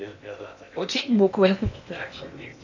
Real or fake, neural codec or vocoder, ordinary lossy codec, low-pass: fake; codec, 16 kHz in and 24 kHz out, 0.8 kbps, FocalCodec, streaming, 65536 codes; none; 7.2 kHz